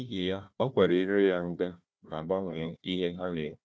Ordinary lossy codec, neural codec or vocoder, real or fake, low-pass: none; codec, 16 kHz, 1 kbps, FunCodec, trained on Chinese and English, 50 frames a second; fake; none